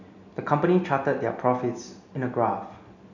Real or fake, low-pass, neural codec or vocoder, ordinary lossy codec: real; 7.2 kHz; none; none